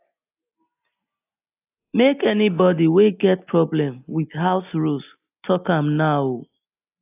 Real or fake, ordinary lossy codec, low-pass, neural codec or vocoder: real; AAC, 32 kbps; 3.6 kHz; none